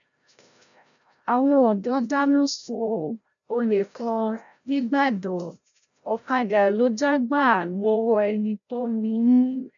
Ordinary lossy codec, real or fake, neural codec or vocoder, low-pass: none; fake; codec, 16 kHz, 0.5 kbps, FreqCodec, larger model; 7.2 kHz